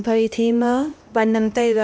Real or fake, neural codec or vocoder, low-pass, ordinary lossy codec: fake; codec, 16 kHz, 1 kbps, X-Codec, HuBERT features, trained on LibriSpeech; none; none